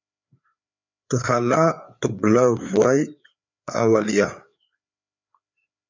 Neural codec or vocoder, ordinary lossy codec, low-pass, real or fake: codec, 16 kHz, 4 kbps, FreqCodec, larger model; MP3, 64 kbps; 7.2 kHz; fake